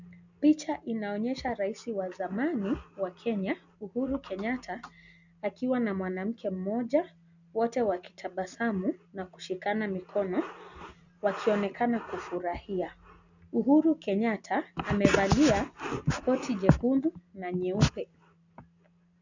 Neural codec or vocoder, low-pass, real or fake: none; 7.2 kHz; real